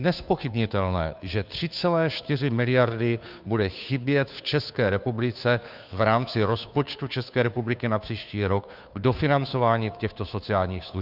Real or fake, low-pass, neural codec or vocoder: fake; 5.4 kHz; codec, 16 kHz, 2 kbps, FunCodec, trained on Chinese and English, 25 frames a second